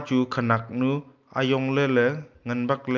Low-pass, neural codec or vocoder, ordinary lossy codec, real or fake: 7.2 kHz; none; Opus, 24 kbps; real